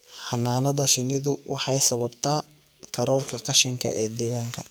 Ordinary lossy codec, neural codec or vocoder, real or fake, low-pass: none; codec, 44.1 kHz, 2.6 kbps, SNAC; fake; none